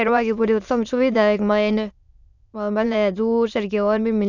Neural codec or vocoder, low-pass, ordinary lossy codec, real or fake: autoencoder, 22.05 kHz, a latent of 192 numbers a frame, VITS, trained on many speakers; 7.2 kHz; none; fake